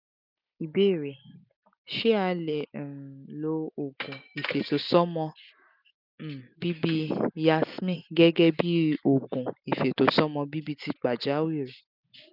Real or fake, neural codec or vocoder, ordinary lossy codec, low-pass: real; none; none; 5.4 kHz